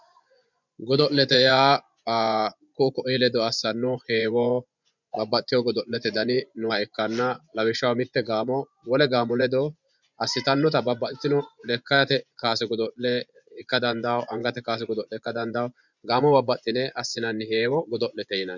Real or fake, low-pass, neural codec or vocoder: fake; 7.2 kHz; vocoder, 24 kHz, 100 mel bands, Vocos